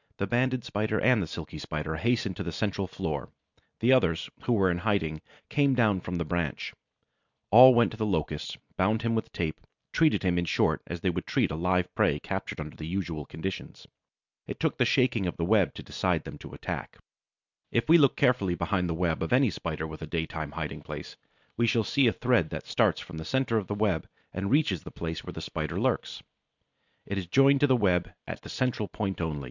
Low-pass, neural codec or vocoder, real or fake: 7.2 kHz; none; real